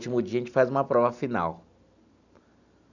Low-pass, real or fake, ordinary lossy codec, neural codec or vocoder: 7.2 kHz; real; none; none